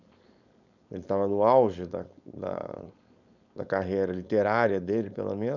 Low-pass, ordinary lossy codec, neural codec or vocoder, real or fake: 7.2 kHz; none; codec, 16 kHz, 4.8 kbps, FACodec; fake